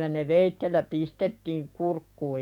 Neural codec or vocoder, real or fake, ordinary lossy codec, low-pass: codec, 44.1 kHz, 7.8 kbps, DAC; fake; none; 19.8 kHz